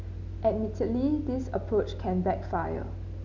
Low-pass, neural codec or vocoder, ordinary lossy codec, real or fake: 7.2 kHz; none; none; real